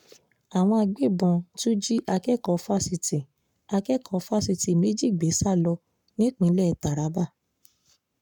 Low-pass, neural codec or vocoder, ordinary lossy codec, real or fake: 19.8 kHz; codec, 44.1 kHz, 7.8 kbps, Pupu-Codec; none; fake